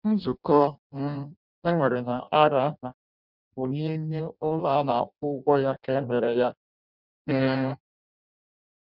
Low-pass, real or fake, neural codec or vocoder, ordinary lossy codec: 5.4 kHz; fake; codec, 16 kHz in and 24 kHz out, 0.6 kbps, FireRedTTS-2 codec; none